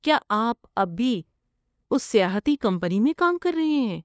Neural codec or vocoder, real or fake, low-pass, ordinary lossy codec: codec, 16 kHz, 2 kbps, FunCodec, trained on LibriTTS, 25 frames a second; fake; none; none